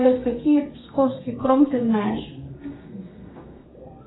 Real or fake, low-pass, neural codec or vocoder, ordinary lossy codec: fake; 7.2 kHz; codec, 32 kHz, 1.9 kbps, SNAC; AAC, 16 kbps